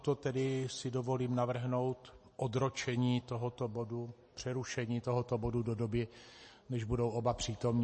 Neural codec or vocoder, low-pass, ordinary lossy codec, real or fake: none; 10.8 kHz; MP3, 32 kbps; real